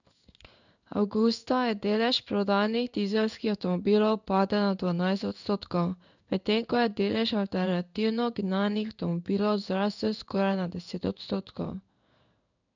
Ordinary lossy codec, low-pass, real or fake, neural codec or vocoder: none; 7.2 kHz; fake; codec, 16 kHz in and 24 kHz out, 1 kbps, XY-Tokenizer